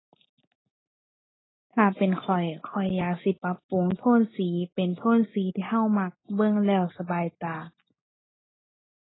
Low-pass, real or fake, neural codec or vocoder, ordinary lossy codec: 7.2 kHz; real; none; AAC, 16 kbps